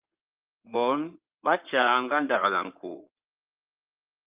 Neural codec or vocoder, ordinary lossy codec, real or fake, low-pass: codec, 16 kHz in and 24 kHz out, 2.2 kbps, FireRedTTS-2 codec; Opus, 24 kbps; fake; 3.6 kHz